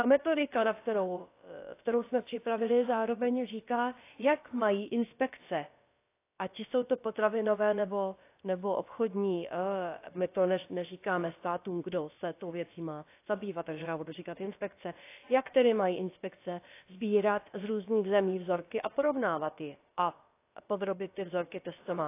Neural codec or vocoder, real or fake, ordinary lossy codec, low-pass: codec, 16 kHz, about 1 kbps, DyCAST, with the encoder's durations; fake; AAC, 24 kbps; 3.6 kHz